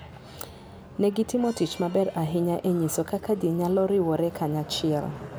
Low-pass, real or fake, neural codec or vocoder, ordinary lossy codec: none; real; none; none